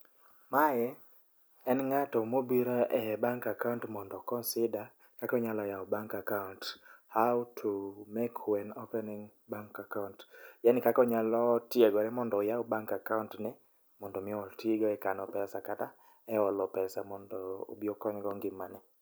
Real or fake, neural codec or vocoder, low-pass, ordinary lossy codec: real; none; none; none